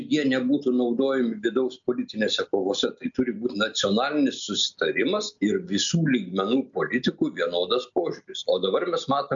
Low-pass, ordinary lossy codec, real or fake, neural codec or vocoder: 7.2 kHz; MP3, 64 kbps; real; none